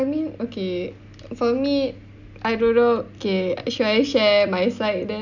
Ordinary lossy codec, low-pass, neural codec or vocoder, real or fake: none; 7.2 kHz; none; real